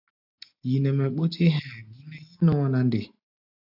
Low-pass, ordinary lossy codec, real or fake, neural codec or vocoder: 5.4 kHz; AAC, 48 kbps; real; none